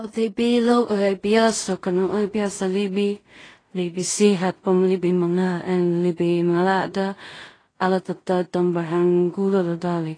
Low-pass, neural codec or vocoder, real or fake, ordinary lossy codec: 9.9 kHz; codec, 16 kHz in and 24 kHz out, 0.4 kbps, LongCat-Audio-Codec, two codebook decoder; fake; AAC, 32 kbps